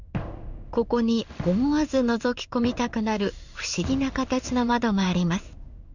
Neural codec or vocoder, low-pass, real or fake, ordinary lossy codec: codec, 16 kHz in and 24 kHz out, 1 kbps, XY-Tokenizer; 7.2 kHz; fake; none